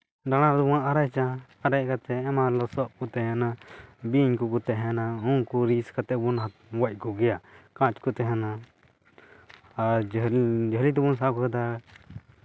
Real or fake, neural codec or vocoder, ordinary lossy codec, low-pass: real; none; none; none